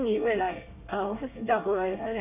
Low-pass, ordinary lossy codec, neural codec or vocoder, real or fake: 3.6 kHz; none; codec, 24 kHz, 1 kbps, SNAC; fake